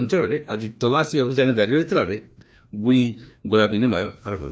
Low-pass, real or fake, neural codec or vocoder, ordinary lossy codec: none; fake; codec, 16 kHz, 1 kbps, FreqCodec, larger model; none